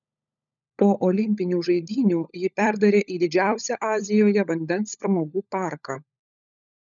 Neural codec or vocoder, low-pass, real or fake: codec, 16 kHz, 16 kbps, FunCodec, trained on LibriTTS, 50 frames a second; 7.2 kHz; fake